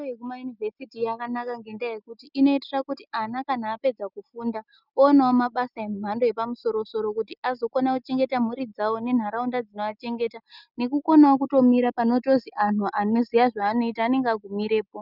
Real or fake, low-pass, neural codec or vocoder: real; 5.4 kHz; none